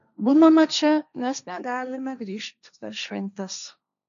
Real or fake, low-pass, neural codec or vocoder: fake; 7.2 kHz; codec, 16 kHz, 1.1 kbps, Voila-Tokenizer